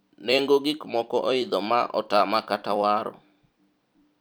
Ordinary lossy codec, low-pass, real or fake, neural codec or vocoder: none; none; fake; vocoder, 44.1 kHz, 128 mel bands every 256 samples, BigVGAN v2